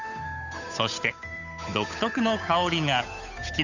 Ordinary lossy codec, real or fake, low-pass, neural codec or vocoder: none; fake; 7.2 kHz; codec, 16 kHz, 8 kbps, FunCodec, trained on Chinese and English, 25 frames a second